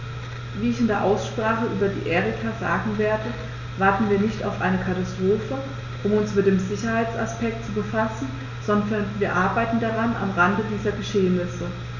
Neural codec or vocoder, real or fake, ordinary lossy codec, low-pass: none; real; none; 7.2 kHz